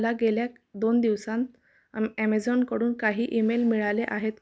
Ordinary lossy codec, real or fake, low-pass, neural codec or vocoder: none; real; none; none